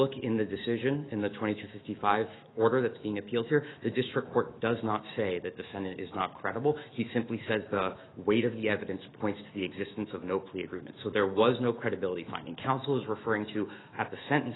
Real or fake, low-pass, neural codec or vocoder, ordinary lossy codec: fake; 7.2 kHz; autoencoder, 48 kHz, 128 numbers a frame, DAC-VAE, trained on Japanese speech; AAC, 16 kbps